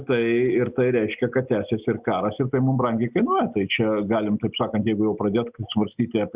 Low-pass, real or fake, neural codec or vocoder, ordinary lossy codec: 3.6 kHz; real; none; Opus, 64 kbps